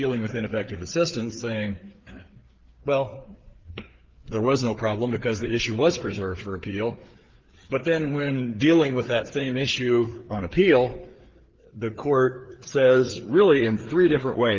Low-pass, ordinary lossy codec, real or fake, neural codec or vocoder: 7.2 kHz; Opus, 16 kbps; fake; codec, 16 kHz, 4 kbps, FreqCodec, larger model